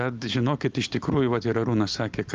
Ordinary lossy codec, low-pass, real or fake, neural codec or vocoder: Opus, 32 kbps; 7.2 kHz; real; none